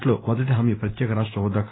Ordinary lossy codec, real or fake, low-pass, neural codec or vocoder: AAC, 16 kbps; real; 7.2 kHz; none